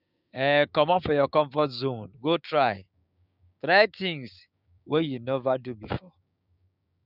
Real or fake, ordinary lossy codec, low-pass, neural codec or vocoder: fake; none; 5.4 kHz; codec, 16 kHz, 6 kbps, DAC